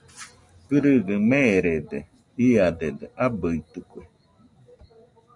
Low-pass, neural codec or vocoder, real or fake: 10.8 kHz; none; real